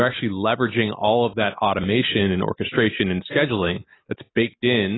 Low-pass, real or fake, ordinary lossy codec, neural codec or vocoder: 7.2 kHz; real; AAC, 16 kbps; none